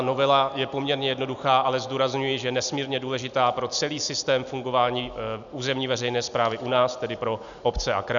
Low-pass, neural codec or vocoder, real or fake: 7.2 kHz; none; real